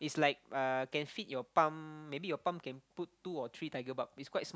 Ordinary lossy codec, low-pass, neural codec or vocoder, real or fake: none; none; none; real